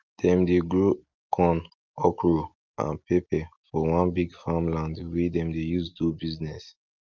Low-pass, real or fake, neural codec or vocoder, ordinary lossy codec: 7.2 kHz; real; none; Opus, 24 kbps